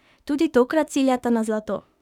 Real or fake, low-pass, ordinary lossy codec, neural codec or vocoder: fake; 19.8 kHz; none; autoencoder, 48 kHz, 32 numbers a frame, DAC-VAE, trained on Japanese speech